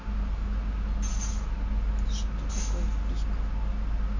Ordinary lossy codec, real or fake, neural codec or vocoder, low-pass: none; real; none; 7.2 kHz